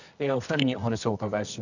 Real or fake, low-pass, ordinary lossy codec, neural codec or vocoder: fake; 7.2 kHz; none; codec, 24 kHz, 0.9 kbps, WavTokenizer, medium music audio release